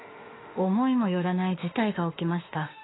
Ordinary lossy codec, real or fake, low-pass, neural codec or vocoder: AAC, 16 kbps; fake; 7.2 kHz; autoencoder, 48 kHz, 32 numbers a frame, DAC-VAE, trained on Japanese speech